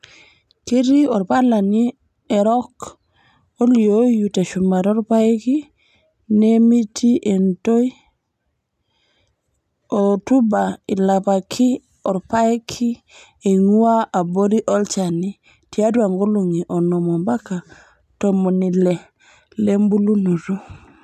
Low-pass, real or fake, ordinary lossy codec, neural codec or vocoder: 14.4 kHz; real; MP3, 96 kbps; none